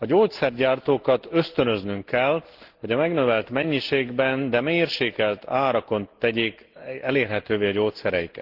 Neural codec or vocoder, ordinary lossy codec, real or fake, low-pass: none; Opus, 16 kbps; real; 5.4 kHz